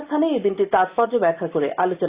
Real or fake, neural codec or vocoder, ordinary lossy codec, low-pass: real; none; Opus, 64 kbps; 3.6 kHz